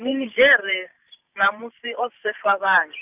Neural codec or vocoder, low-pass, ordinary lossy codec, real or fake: vocoder, 44.1 kHz, 128 mel bands every 512 samples, BigVGAN v2; 3.6 kHz; none; fake